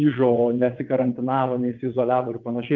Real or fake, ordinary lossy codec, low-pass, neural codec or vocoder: fake; Opus, 32 kbps; 7.2 kHz; vocoder, 22.05 kHz, 80 mel bands, WaveNeXt